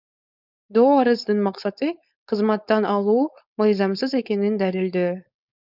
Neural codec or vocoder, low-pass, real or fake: codec, 16 kHz, 4.8 kbps, FACodec; 5.4 kHz; fake